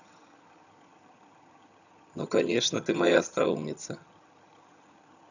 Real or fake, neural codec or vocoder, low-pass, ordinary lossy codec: fake; vocoder, 22.05 kHz, 80 mel bands, HiFi-GAN; 7.2 kHz; none